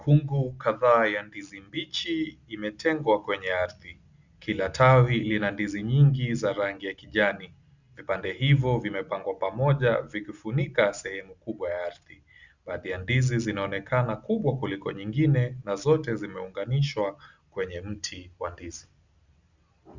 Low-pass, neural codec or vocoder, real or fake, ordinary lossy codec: 7.2 kHz; none; real; Opus, 64 kbps